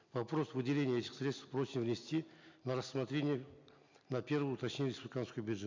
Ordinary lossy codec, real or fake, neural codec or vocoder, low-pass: MP3, 64 kbps; real; none; 7.2 kHz